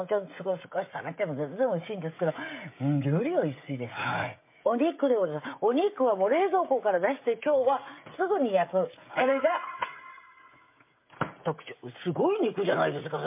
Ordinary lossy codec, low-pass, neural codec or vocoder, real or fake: MP3, 24 kbps; 3.6 kHz; codec, 16 kHz, 16 kbps, FreqCodec, smaller model; fake